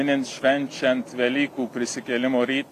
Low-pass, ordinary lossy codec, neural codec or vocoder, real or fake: 14.4 kHz; AAC, 64 kbps; none; real